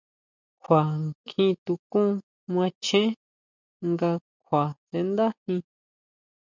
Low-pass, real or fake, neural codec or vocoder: 7.2 kHz; real; none